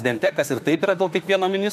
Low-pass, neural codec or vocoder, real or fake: 14.4 kHz; autoencoder, 48 kHz, 32 numbers a frame, DAC-VAE, trained on Japanese speech; fake